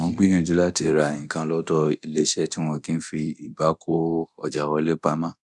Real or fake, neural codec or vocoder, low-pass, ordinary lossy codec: fake; codec, 24 kHz, 0.9 kbps, DualCodec; 10.8 kHz; none